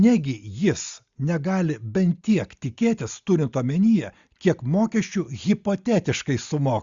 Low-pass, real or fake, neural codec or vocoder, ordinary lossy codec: 7.2 kHz; real; none; Opus, 64 kbps